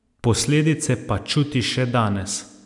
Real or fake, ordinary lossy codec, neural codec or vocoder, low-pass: real; none; none; 10.8 kHz